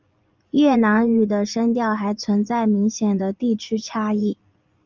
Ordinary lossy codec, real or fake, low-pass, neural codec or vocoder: Opus, 32 kbps; fake; 7.2 kHz; vocoder, 24 kHz, 100 mel bands, Vocos